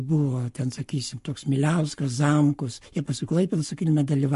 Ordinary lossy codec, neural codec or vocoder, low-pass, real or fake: MP3, 48 kbps; codec, 44.1 kHz, 7.8 kbps, Pupu-Codec; 14.4 kHz; fake